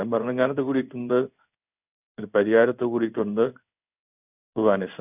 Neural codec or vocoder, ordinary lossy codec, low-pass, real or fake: codec, 16 kHz in and 24 kHz out, 1 kbps, XY-Tokenizer; none; 3.6 kHz; fake